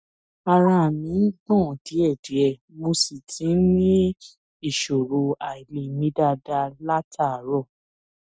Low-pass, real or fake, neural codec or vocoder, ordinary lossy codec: none; real; none; none